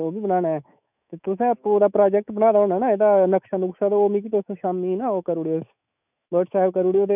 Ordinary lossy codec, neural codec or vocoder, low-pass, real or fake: none; autoencoder, 48 kHz, 128 numbers a frame, DAC-VAE, trained on Japanese speech; 3.6 kHz; fake